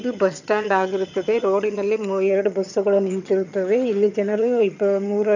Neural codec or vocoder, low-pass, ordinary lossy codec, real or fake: vocoder, 22.05 kHz, 80 mel bands, HiFi-GAN; 7.2 kHz; none; fake